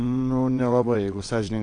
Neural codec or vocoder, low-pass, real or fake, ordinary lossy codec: vocoder, 22.05 kHz, 80 mel bands, WaveNeXt; 9.9 kHz; fake; AAC, 48 kbps